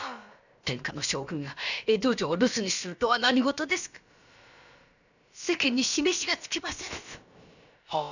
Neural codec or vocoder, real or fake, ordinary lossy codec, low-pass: codec, 16 kHz, about 1 kbps, DyCAST, with the encoder's durations; fake; none; 7.2 kHz